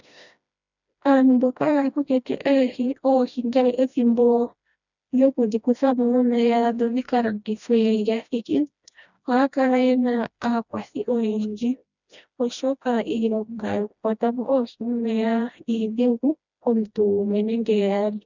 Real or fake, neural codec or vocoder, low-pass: fake; codec, 16 kHz, 1 kbps, FreqCodec, smaller model; 7.2 kHz